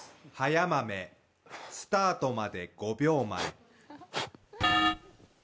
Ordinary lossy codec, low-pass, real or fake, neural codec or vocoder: none; none; real; none